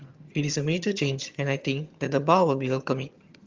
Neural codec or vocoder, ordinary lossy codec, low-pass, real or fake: vocoder, 22.05 kHz, 80 mel bands, HiFi-GAN; Opus, 32 kbps; 7.2 kHz; fake